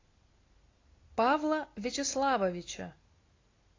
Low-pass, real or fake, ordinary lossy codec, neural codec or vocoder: 7.2 kHz; real; AAC, 32 kbps; none